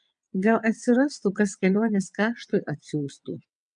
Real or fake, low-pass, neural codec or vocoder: fake; 9.9 kHz; vocoder, 22.05 kHz, 80 mel bands, WaveNeXt